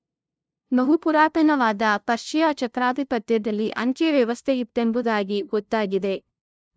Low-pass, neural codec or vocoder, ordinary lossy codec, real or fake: none; codec, 16 kHz, 0.5 kbps, FunCodec, trained on LibriTTS, 25 frames a second; none; fake